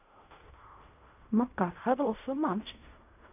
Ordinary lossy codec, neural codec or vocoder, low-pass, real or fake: none; codec, 16 kHz in and 24 kHz out, 0.4 kbps, LongCat-Audio-Codec, fine tuned four codebook decoder; 3.6 kHz; fake